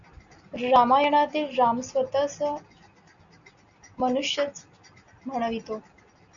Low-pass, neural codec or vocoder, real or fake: 7.2 kHz; none; real